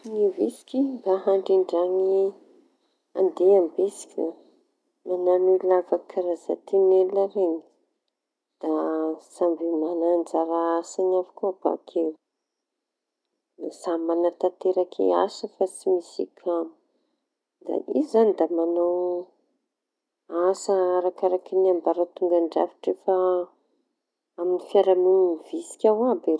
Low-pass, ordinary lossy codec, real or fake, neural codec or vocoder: none; none; real; none